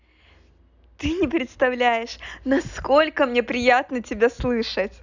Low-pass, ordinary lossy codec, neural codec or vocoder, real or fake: 7.2 kHz; none; none; real